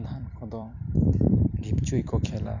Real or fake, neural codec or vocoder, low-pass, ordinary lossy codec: fake; vocoder, 44.1 kHz, 128 mel bands every 256 samples, BigVGAN v2; 7.2 kHz; none